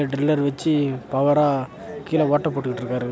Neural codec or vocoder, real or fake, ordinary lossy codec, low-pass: none; real; none; none